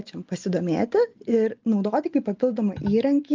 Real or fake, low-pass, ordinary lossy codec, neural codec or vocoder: real; 7.2 kHz; Opus, 24 kbps; none